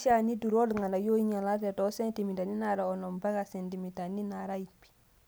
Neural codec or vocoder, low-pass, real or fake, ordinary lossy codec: none; none; real; none